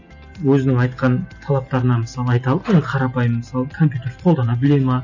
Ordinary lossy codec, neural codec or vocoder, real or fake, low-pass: none; none; real; 7.2 kHz